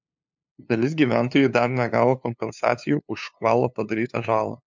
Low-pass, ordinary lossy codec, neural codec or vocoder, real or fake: 7.2 kHz; MP3, 64 kbps; codec, 16 kHz, 2 kbps, FunCodec, trained on LibriTTS, 25 frames a second; fake